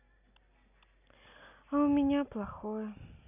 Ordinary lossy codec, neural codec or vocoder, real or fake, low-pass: none; none; real; 3.6 kHz